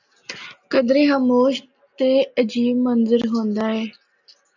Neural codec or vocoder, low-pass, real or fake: none; 7.2 kHz; real